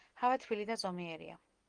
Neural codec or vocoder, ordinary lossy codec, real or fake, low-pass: none; Opus, 24 kbps; real; 9.9 kHz